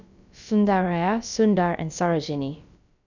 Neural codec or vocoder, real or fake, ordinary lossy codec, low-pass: codec, 16 kHz, about 1 kbps, DyCAST, with the encoder's durations; fake; none; 7.2 kHz